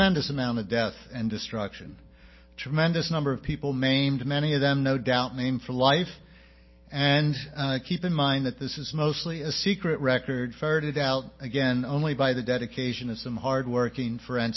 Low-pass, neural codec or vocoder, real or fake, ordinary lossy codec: 7.2 kHz; none; real; MP3, 24 kbps